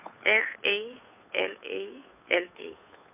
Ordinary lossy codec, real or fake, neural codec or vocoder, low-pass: none; fake; codec, 16 kHz, 2 kbps, FunCodec, trained on Chinese and English, 25 frames a second; 3.6 kHz